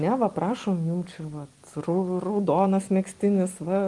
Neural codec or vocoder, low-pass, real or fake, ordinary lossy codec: none; 10.8 kHz; real; Opus, 24 kbps